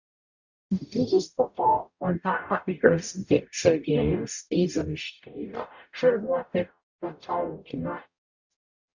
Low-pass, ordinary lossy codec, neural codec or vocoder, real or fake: 7.2 kHz; Opus, 64 kbps; codec, 44.1 kHz, 0.9 kbps, DAC; fake